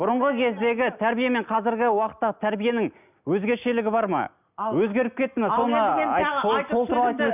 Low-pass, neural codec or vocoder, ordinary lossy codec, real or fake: 3.6 kHz; none; none; real